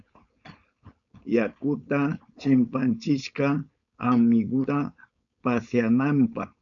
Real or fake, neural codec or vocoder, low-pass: fake; codec, 16 kHz, 4.8 kbps, FACodec; 7.2 kHz